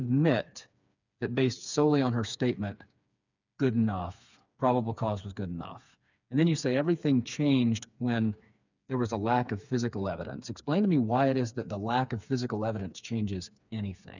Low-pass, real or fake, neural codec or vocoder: 7.2 kHz; fake; codec, 16 kHz, 4 kbps, FreqCodec, smaller model